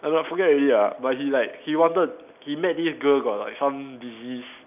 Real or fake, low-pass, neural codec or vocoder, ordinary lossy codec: real; 3.6 kHz; none; none